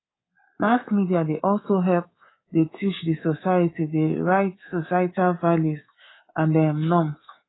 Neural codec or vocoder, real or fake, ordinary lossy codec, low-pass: codec, 24 kHz, 3.1 kbps, DualCodec; fake; AAC, 16 kbps; 7.2 kHz